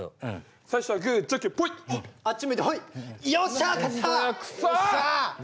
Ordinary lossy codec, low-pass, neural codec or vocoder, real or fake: none; none; none; real